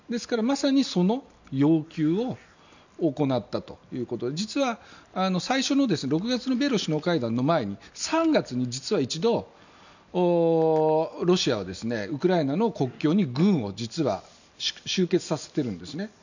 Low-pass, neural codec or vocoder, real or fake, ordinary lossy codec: 7.2 kHz; none; real; none